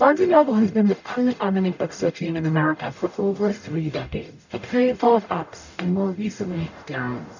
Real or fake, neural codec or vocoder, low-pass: fake; codec, 44.1 kHz, 0.9 kbps, DAC; 7.2 kHz